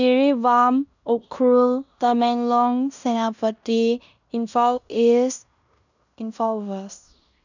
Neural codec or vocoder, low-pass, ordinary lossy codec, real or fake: codec, 16 kHz in and 24 kHz out, 0.9 kbps, LongCat-Audio-Codec, fine tuned four codebook decoder; 7.2 kHz; none; fake